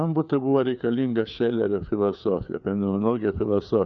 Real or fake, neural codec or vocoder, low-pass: fake; codec, 16 kHz, 4 kbps, FreqCodec, larger model; 7.2 kHz